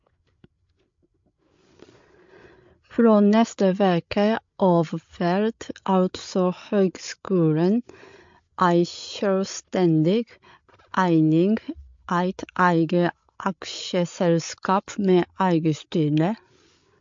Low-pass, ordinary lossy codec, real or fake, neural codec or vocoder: 7.2 kHz; MP3, 48 kbps; fake; codec, 16 kHz, 8 kbps, FreqCodec, larger model